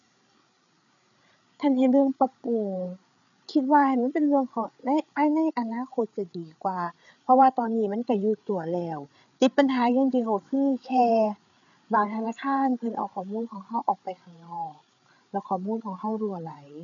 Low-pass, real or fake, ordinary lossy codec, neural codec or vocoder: 7.2 kHz; fake; none; codec, 16 kHz, 8 kbps, FreqCodec, larger model